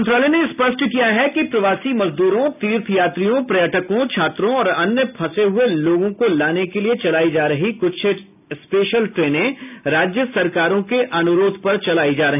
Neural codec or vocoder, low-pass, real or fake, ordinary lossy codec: none; 3.6 kHz; real; none